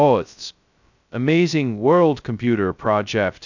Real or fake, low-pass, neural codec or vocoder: fake; 7.2 kHz; codec, 16 kHz, 0.2 kbps, FocalCodec